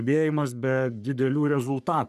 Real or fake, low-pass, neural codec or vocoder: fake; 14.4 kHz; codec, 44.1 kHz, 3.4 kbps, Pupu-Codec